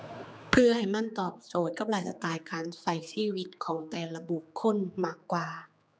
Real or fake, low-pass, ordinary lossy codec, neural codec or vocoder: fake; none; none; codec, 16 kHz, 4 kbps, X-Codec, HuBERT features, trained on general audio